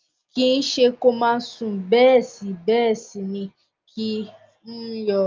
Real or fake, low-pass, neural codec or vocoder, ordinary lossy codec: real; 7.2 kHz; none; Opus, 24 kbps